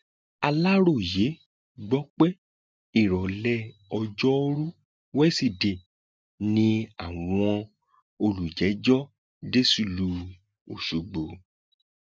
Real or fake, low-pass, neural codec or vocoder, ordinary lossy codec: real; none; none; none